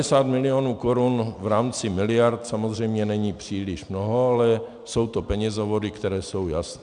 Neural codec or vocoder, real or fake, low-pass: none; real; 9.9 kHz